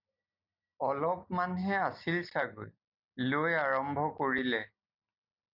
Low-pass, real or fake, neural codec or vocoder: 5.4 kHz; real; none